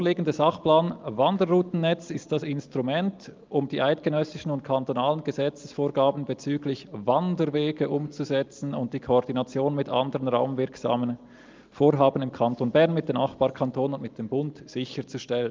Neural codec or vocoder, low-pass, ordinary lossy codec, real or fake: none; 7.2 kHz; Opus, 24 kbps; real